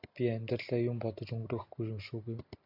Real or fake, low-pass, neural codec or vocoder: real; 5.4 kHz; none